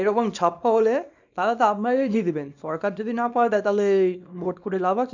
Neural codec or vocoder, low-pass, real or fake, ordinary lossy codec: codec, 24 kHz, 0.9 kbps, WavTokenizer, small release; 7.2 kHz; fake; none